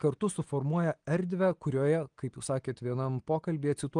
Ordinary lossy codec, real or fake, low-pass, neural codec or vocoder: Opus, 24 kbps; real; 9.9 kHz; none